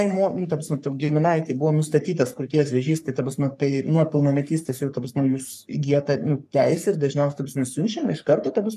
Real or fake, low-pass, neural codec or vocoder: fake; 14.4 kHz; codec, 44.1 kHz, 3.4 kbps, Pupu-Codec